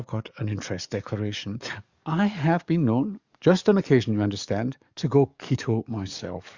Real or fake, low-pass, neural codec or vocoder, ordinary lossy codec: fake; 7.2 kHz; codec, 44.1 kHz, 7.8 kbps, DAC; Opus, 64 kbps